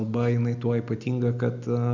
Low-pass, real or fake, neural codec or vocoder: 7.2 kHz; real; none